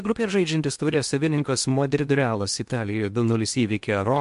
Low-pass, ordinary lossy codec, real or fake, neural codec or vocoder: 10.8 kHz; MP3, 64 kbps; fake; codec, 16 kHz in and 24 kHz out, 0.8 kbps, FocalCodec, streaming, 65536 codes